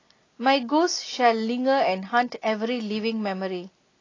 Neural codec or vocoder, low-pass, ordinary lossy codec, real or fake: none; 7.2 kHz; AAC, 32 kbps; real